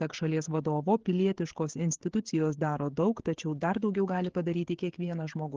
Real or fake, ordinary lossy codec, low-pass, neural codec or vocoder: fake; Opus, 16 kbps; 7.2 kHz; codec, 16 kHz, 16 kbps, FreqCodec, smaller model